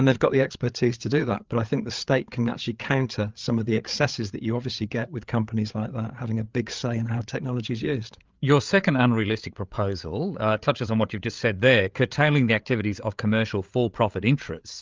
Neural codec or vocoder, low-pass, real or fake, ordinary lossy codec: codec, 16 kHz, 8 kbps, FreqCodec, larger model; 7.2 kHz; fake; Opus, 32 kbps